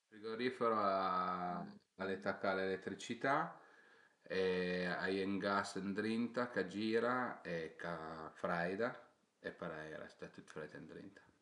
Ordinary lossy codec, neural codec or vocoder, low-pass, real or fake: none; none; 10.8 kHz; real